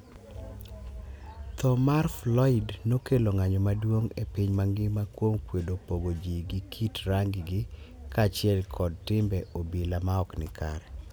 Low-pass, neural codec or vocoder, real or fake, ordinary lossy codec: none; none; real; none